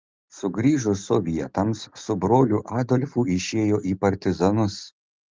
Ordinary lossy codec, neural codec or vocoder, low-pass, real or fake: Opus, 32 kbps; none; 7.2 kHz; real